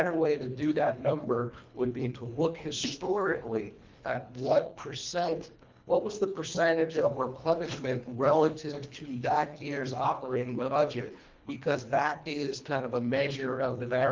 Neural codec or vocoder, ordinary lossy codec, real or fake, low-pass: codec, 24 kHz, 1.5 kbps, HILCodec; Opus, 32 kbps; fake; 7.2 kHz